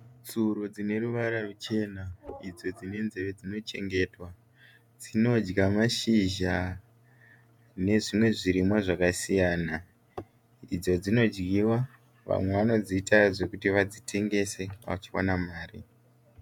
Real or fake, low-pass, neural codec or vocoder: fake; 19.8 kHz; vocoder, 48 kHz, 128 mel bands, Vocos